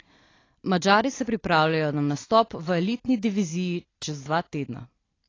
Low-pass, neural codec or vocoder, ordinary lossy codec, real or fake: 7.2 kHz; vocoder, 44.1 kHz, 128 mel bands every 256 samples, BigVGAN v2; AAC, 32 kbps; fake